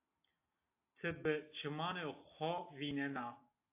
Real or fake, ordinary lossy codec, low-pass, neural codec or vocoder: real; AAC, 24 kbps; 3.6 kHz; none